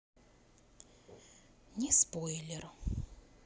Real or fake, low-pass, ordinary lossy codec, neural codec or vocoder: real; none; none; none